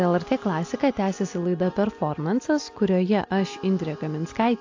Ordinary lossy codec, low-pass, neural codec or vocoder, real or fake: AAC, 48 kbps; 7.2 kHz; none; real